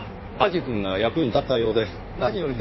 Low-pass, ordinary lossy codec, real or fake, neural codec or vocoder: 7.2 kHz; MP3, 24 kbps; fake; codec, 16 kHz in and 24 kHz out, 1.1 kbps, FireRedTTS-2 codec